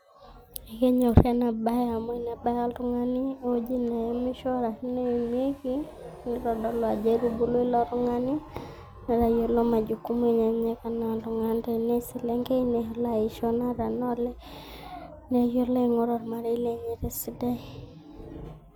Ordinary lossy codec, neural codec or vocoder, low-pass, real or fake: none; none; none; real